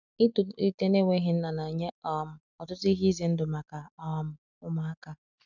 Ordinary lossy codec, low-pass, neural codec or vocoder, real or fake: none; 7.2 kHz; none; real